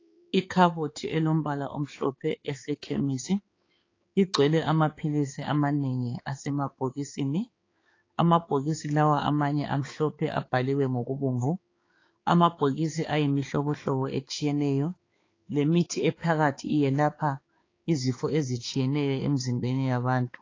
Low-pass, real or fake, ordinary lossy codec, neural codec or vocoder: 7.2 kHz; fake; AAC, 32 kbps; codec, 16 kHz, 4 kbps, X-Codec, HuBERT features, trained on balanced general audio